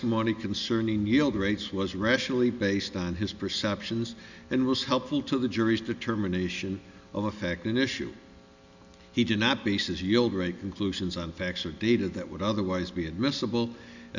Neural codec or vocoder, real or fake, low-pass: none; real; 7.2 kHz